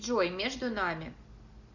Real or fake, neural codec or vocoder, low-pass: real; none; 7.2 kHz